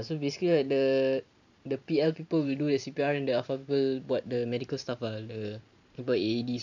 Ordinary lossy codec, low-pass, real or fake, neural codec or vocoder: none; 7.2 kHz; fake; vocoder, 44.1 kHz, 128 mel bands every 512 samples, BigVGAN v2